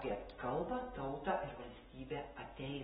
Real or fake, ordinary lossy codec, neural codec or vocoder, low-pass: real; AAC, 16 kbps; none; 14.4 kHz